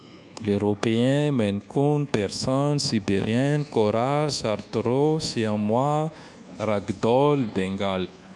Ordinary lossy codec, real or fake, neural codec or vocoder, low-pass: none; fake; codec, 24 kHz, 1.2 kbps, DualCodec; none